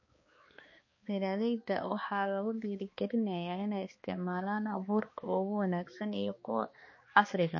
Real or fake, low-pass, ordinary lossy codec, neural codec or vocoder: fake; 7.2 kHz; MP3, 32 kbps; codec, 16 kHz, 2 kbps, X-Codec, HuBERT features, trained on balanced general audio